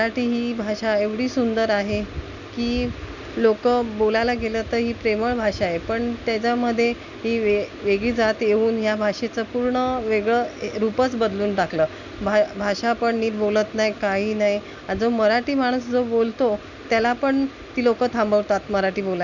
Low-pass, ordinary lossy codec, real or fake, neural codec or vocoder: 7.2 kHz; none; real; none